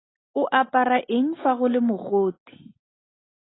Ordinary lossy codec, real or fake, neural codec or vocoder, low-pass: AAC, 16 kbps; real; none; 7.2 kHz